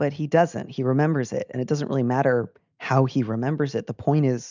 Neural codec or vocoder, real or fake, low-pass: none; real; 7.2 kHz